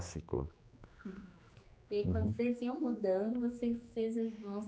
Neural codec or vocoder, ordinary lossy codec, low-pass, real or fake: codec, 16 kHz, 2 kbps, X-Codec, HuBERT features, trained on general audio; none; none; fake